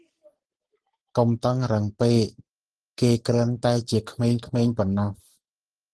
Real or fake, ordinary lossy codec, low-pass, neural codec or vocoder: real; Opus, 16 kbps; 10.8 kHz; none